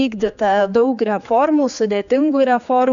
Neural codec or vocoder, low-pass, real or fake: codec, 16 kHz, 4 kbps, X-Codec, HuBERT features, trained on general audio; 7.2 kHz; fake